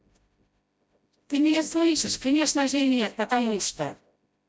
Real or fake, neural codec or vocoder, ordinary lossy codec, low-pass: fake; codec, 16 kHz, 0.5 kbps, FreqCodec, smaller model; none; none